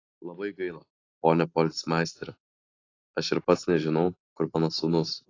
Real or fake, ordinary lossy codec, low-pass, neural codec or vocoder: real; AAC, 32 kbps; 7.2 kHz; none